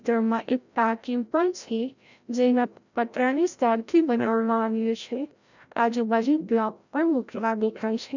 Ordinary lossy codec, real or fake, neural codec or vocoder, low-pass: none; fake; codec, 16 kHz, 0.5 kbps, FreqCodec, larger model; 7.2 kHz